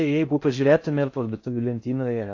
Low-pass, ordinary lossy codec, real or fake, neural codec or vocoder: 7.2 kHz; AAC, 48 kbps; fake; codec, 16 kHz in and 24 kHz out, 0.6 kbps, FocalCodec, streaming, 4096 codes